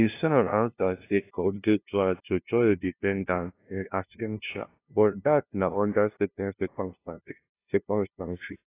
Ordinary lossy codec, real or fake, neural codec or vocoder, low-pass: AAC, 24 kbps; fake; codec, 16 kHz, 0.5 kbps, FunCodec, trained on LibriTTS, 25 frames a second; 3.6 kHz